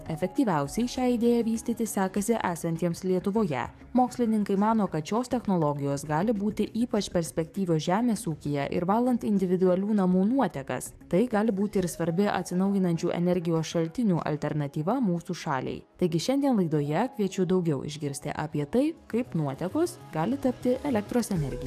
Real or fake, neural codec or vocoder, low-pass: fake; codec, 44.1 kHz, 7.8 kbps, DAC; 14.4 kHz